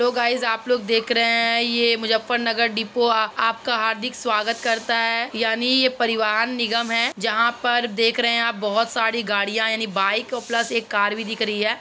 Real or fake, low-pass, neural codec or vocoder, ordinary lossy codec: real; none; none; none